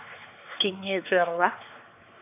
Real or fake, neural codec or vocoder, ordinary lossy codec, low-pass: fake; codec, 16 kHz, 4 kbps, X-Codec, WavLM features, trained on Multilingual LibriSpeech; AAC, 32 kbps; 3.6 kHz